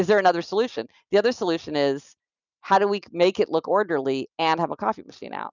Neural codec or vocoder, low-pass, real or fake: none; 7.2 kHz; real